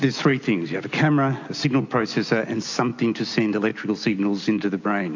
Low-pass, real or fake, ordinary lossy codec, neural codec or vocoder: 7.2 kHz; real; AAC, 48 kbps; none